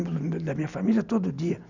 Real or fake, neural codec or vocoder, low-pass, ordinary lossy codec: real; none; 7.2 kHz; none